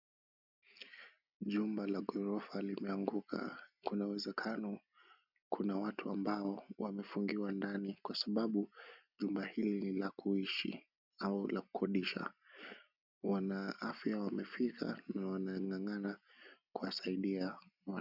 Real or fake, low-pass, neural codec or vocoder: real; 5.4 kHz; none